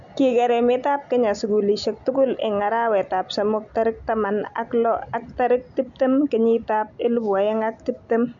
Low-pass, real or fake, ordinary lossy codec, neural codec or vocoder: 7.2 kHz; real; MP3, 64 kbps; none